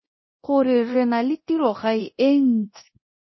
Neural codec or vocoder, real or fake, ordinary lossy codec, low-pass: codec, 24 kHz, 0.9 kbps, DualCodec; fake; MP3, 24 kbps; 7.2 kHz